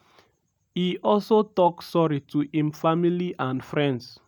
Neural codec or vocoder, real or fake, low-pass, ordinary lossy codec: none; real; none; none